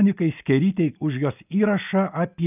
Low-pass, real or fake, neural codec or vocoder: 3.6 kHz; real; none